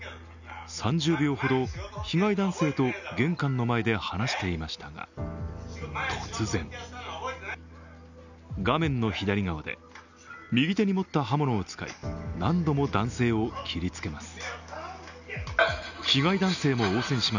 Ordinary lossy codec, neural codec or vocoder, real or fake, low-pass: none; none; real; 7.2 kHz